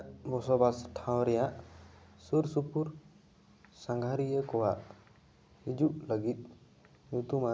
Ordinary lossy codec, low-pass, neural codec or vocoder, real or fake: none; none; none; real